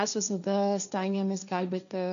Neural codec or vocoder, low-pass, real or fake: codec, 16 kHz, 1.1 kbps, Voila-Tokenizer; 7.2 kHz; fake